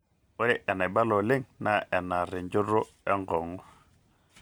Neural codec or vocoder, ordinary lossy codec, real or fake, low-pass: none; none; real; none